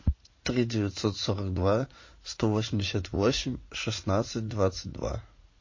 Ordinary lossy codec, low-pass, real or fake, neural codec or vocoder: MP3, 32 kbps; 7.2 kHz; fake; vocoder, 44.1 kHz, 80 mel bands, Vocos